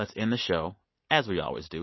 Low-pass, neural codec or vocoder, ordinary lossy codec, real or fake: 7.2 kHz; none; MP3, 24 kbps; real